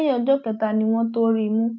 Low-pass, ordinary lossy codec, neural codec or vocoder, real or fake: 7.2 kHz; none; none; real